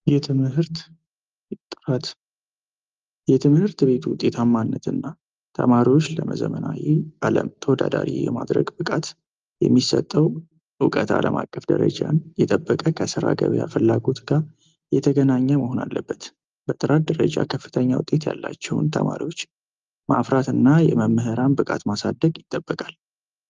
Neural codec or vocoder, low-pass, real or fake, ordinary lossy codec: none; 7.2 kHz; real; Opus, 32 kbps